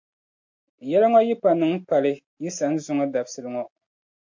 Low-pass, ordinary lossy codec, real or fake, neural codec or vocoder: 7.2 kHz; MP3, 48 kbps; real; none